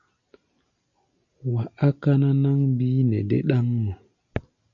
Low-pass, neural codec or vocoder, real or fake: 7.2 kHz; none; real